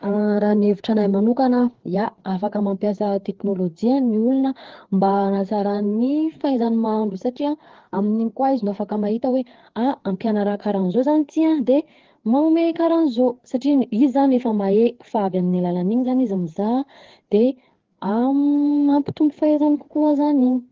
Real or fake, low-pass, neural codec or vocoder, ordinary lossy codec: fake; 7.2 kHz; codec, 16 kHz, 4 kbps, FreqCodec, larger model; Opus, 16 kbps